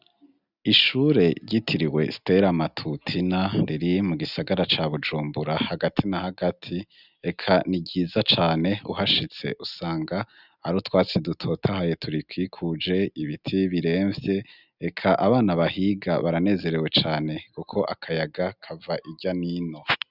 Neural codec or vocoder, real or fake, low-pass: none; real; 5.4 kHz